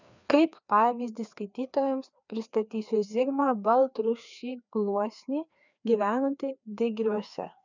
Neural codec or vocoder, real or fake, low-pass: codec, 16 kHz, 2 kbps, FreqCodec, larger model; fake; 7.2 kHz